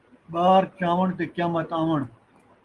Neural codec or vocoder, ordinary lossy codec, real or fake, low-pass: none; Opus, 24 kbps; real; 10.8 kHz